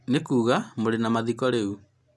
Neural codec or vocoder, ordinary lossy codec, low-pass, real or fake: none; none; none; real